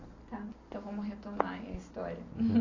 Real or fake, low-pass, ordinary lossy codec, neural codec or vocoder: real; 7.2 kHz; AAC, 32 kbps; none